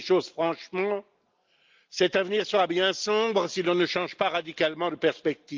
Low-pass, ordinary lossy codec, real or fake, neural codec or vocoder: 7.2 kHz; Opus, 24 kbps; real; none